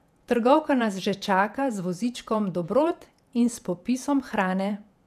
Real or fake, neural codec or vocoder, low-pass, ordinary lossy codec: fake; vocoder, 48 kHz, 128 mel bands, Vocos; 14.4 kHz; none